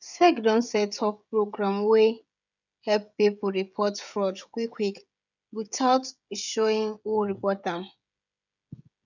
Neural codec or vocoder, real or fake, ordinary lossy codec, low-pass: codec, 16 kHz, 16 kbps, FreqCodec, smaller model; fake; none; 7.2 kHz